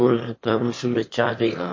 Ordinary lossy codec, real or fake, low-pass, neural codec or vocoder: MP3, 32 kbps; fake; 7.2 kHz; autoencoder, 22.05 kHz, a latent of 192 numbers a frame, VITS, trained on one speaker